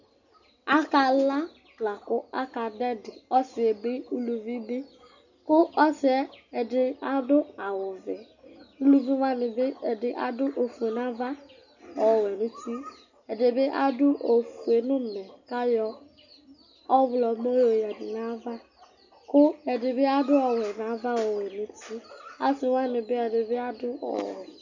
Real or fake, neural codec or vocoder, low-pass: real; none; 7.2 kHz